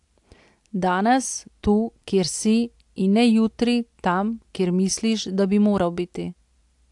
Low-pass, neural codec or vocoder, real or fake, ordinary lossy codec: 10.8 kHz; none; real; AAC, 64 kbps